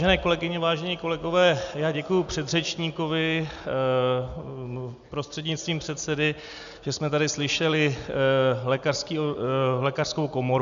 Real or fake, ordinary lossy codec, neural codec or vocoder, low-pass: real; MP3, 96 kbps; none; 7.2 kHz